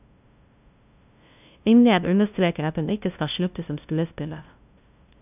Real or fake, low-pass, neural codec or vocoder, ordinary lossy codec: fake; 3.6 kHz; codec, 16 kHz, 0.5 kbps, FunCodec, trained on LibriTTS, 25 frames a second; none